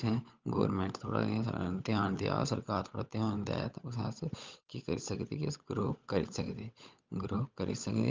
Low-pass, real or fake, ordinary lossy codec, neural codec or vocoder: 7.2 kHz; fake; Opus, 32 kbps; codec, 16 kHz, 16 kbps, FunCodec, trained on LibriTTS, 50 frames a second